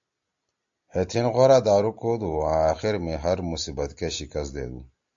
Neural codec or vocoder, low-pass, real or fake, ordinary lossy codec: none; 7.2 kHz; real; AAC, 64 kbps